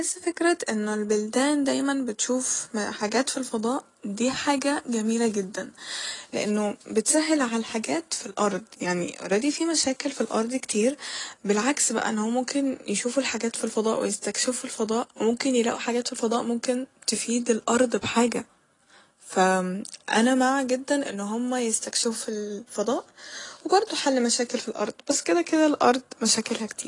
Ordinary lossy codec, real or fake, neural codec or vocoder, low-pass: AAC, 32 kbps; real; none; 10.8 kHz